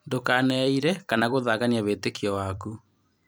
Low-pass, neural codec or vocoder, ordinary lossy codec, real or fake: none; vocoder, 44.1 kHz, 128 mel bands every 256 samples, BigVGAN v2; none; fake